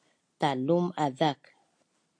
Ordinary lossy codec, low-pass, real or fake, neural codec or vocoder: MP3, 48 kbps; 9.9 kHz; real; none